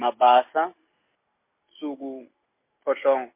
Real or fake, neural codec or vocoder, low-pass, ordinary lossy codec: real; none; 3.6 kHz; MP3, 24 kbps